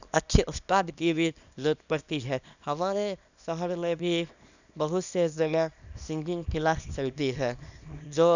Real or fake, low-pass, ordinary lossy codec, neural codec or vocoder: fake; 7.2 kHz; none; codec, 24 kHz, 0.9 kbps, WavTokenizer, small release